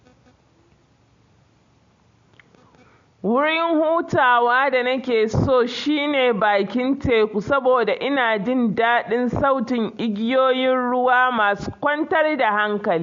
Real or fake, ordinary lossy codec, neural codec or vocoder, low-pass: real; MP3, 48 kbps; none; 7.2 kHz